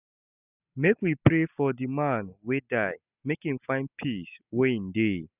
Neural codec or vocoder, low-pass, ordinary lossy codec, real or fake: none; 3.6 kHz; none; real